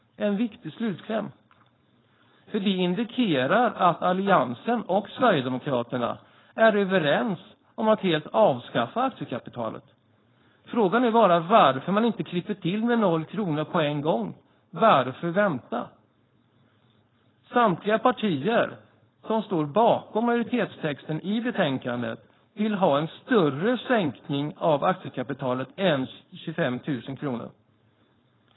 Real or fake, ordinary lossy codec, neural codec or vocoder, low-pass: fake; AAC, 16 kbps; codec, 16 kHz, 4.8 kbps, FACodec; 7.2 kHz